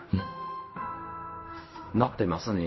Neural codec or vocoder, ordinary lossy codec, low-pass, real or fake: codec, 16 kHz in and 24 kHz out, 0.4 kbps, LongCat-Audio-Codec, fine tuned four codebook decoder; MP3, 24 kbps; 7.2 kHz; fake